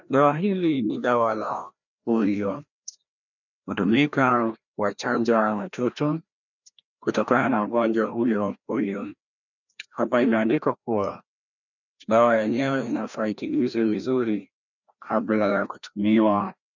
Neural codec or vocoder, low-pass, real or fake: codec, 16 kHz, 1 kbps, FreqCodec, larger model; 7.2 kHz; fake